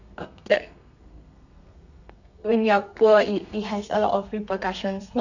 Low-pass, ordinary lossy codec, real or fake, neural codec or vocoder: 7.2 kHz; none; fake; codec, 32 kHz, 1.9 kbps, SNAC